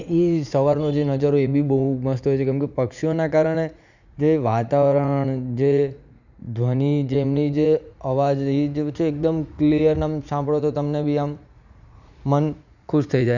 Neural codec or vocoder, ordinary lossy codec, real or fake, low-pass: vocoder, 44.1 kHz, 80 mel bands, Vocos; none; fake; 7.2 kHz